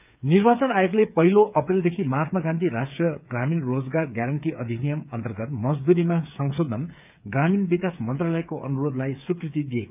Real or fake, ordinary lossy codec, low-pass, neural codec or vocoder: fake; none; 3.6 kHz; codec, 16 kHz, 8 kbps, FreqCodec, smaller model